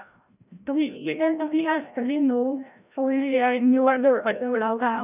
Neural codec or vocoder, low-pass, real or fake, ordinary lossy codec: codec, 16 kHz, 0.5 kbps, FreqCodec, larger model; 3.6 kHz; fake; none